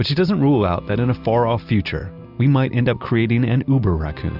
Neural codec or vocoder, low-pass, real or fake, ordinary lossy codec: none; 5.4 kHz; real; Opus, 64 kbps